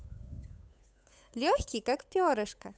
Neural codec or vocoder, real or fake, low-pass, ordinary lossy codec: codec, 16 kHz, 8 kbps, FunCodec, trained on Chinese and English, 25 frames a second; fake; none; none